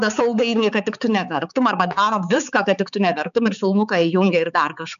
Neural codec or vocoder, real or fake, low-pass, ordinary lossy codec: codec, 16 kHz, 4 kbps, X-Codec, HuBERT features, trained on balanced general audio; fake; 7.2 kHz; MP3, 96 kbps